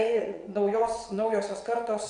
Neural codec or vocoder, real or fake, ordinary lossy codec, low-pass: vocoder, 22.05 kHz, 80 mel bands, WaveNeXt; fake; MP3, 96 kbps; 9.9 kHz